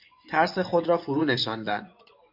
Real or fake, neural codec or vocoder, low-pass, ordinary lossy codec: fake; vocoder, 24 kHz, 100 mel bands, Vocos; 5.4 kHz; MP3, 48 kbps